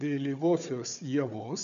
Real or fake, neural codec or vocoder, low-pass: fake; codec, 16 kHz, 4 kbps, FunCodec, trained on Chinese and English, 50 frames a second; 7.2 kHz